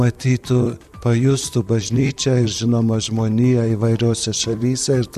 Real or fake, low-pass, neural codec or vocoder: fake; 14.4 kHz; vocoder, 44.1 kHz, 128 mel bands, Pupu-Vocoder